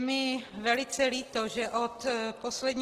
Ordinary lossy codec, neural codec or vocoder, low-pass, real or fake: Opus, 16 kbps; none; 14.4 kHz; real